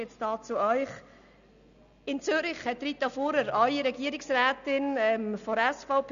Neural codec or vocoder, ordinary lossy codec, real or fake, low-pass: none; none; real; 7.2 kHz